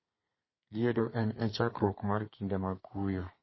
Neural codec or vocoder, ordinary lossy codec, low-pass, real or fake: codec, 32 kHz, 1.9 kbps, SNAC; MP3, 24 kbps; 7.2 kHz; fake